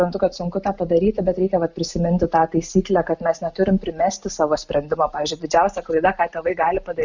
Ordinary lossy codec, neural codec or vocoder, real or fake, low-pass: Opus, 64 kbps; none; real; 7.2 kHz